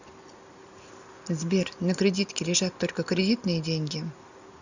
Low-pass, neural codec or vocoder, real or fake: 7.2 kHz; none; real